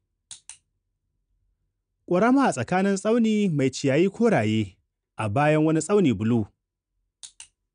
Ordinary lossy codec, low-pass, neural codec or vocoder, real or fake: none; 9.9 kHz; none; real